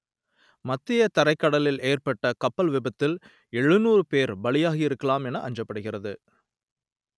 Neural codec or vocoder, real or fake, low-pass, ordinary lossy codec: none; real; none; none